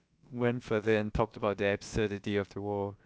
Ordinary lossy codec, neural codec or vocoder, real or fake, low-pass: none; codec, 16 kHz, about 1 kbps, DyCAST, with the encoder's durations; fake; none